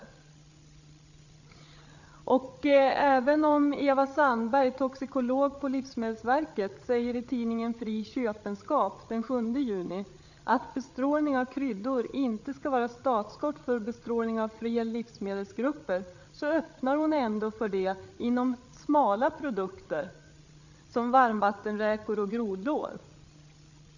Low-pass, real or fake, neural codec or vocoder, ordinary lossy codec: 7.2 kHz; fake; codec, 16 kHz, 8 kbps, FreqCodec, larger model; none